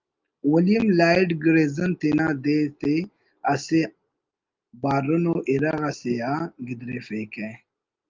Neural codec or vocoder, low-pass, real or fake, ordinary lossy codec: none; 7.2 kHz; real; Opus, 24 kbps